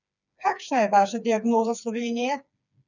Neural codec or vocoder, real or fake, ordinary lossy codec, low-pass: codec, 16 kHz, 4 kbps, FreqCodec, smaller model; fake; none; 7.2 kHz